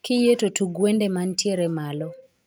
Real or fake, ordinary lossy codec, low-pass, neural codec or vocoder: real; none; none; none